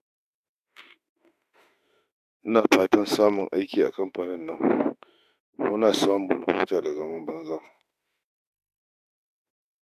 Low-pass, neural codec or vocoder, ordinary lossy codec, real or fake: 14.4 kHz; autoencoder, 48 kHz, 32 numbers a frame, DAC-VAE, trained on Japanese speech; AAC, 96 kbps; fake